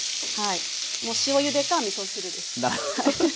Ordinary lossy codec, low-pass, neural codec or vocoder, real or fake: none; none; none; real